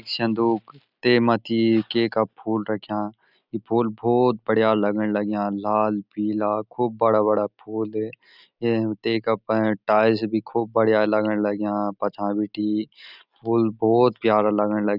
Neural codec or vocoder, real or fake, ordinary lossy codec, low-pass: none; real; none; 5.4 kHz